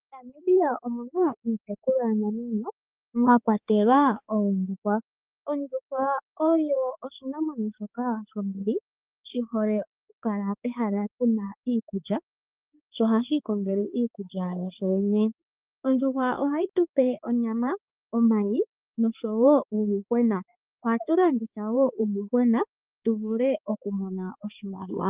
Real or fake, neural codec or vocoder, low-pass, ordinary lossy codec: fake; codec, 16 kHz, 4 kbps, X-Codec, HuBERT features, trained on balanced general audio; 3.6 kHz; Opus, 32 kbps